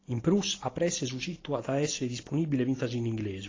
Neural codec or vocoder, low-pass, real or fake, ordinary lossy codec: none; 7.2 kHz; real; AAC, 32 kbps